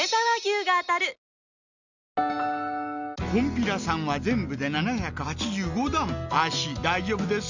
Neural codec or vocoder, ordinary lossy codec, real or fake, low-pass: none; none; real; 7.2 kHz